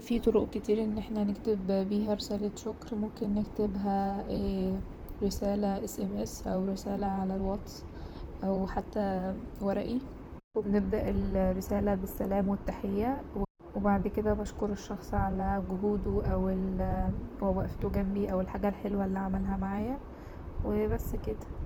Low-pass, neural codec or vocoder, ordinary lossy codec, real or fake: none; vocoder, 44.1 kHz, 128 mel bands, Pupu-Vocoder; none; fake